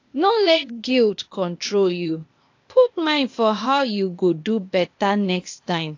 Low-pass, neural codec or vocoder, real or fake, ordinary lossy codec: 7.2 kHz; codec, 16 kHz, 0.8 kbps, ZipCodec; fake; AAC, 48 kbps